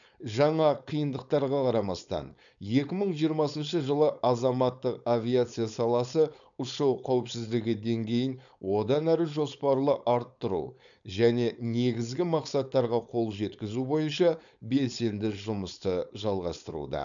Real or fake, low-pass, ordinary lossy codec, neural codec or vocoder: fake; 7.2 kHz; none; codec, 16 kHz, 4.8 kbps, FACodec